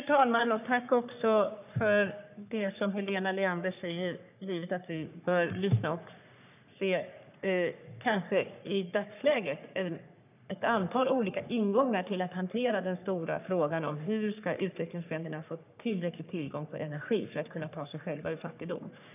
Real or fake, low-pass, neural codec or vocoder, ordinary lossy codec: fake; 3.6 kHz; codec, 44.1 kHz, 3.4 kbps, Pupu-Codec; none